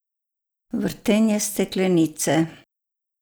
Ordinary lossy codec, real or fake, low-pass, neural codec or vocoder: none; real; none; none